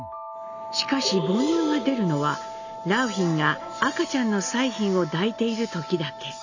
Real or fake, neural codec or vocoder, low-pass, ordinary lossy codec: real; none; 7.2 kHz; none